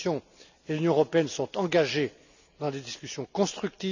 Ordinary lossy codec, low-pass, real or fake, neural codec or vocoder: none; 7.2 kHz; real; none